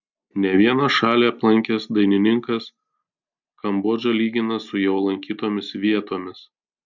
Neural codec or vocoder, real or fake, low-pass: none; real; 7.2 kHz